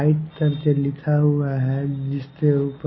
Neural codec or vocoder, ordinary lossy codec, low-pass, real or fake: none; MP3, 24 kbps; 7.2 kHz; real